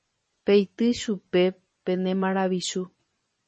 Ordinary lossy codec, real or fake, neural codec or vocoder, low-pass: MP3, 32 kbps; fake; vocoder, 24 kHz, 100 mel bands, Vocos; 10.8 kHz